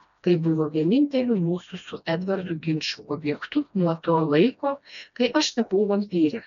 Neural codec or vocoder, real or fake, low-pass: codec, 16 kHz, 1 kbps, FreqCodec, smaller model; fake; 7.2 kHz